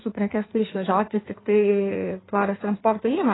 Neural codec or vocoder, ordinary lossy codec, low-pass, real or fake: codec, 16 kHz in and 24 kHz out, 1.1 kbps, FireRedTTS-2 codec; AAC, 16 kbps; 7.2 kHz; fake